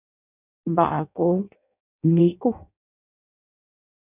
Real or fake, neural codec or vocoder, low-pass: fake; codec, 16 kHz in and 24 kHz out, 0.6 kbps, FireRedTTS-2 codec; 3.6 kHz